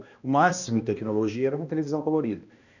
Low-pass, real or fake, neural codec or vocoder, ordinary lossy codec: 7.2 kHz; fake; codec, 16 kHz, 1 kbps, X-Codec, HuBERT features, trained on balanced general audio; none